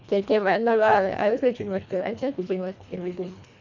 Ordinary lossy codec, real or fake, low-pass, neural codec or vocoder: none; fake; 7.2 kHz; codec, 24 kHz, 1.5 kbps, HILCodec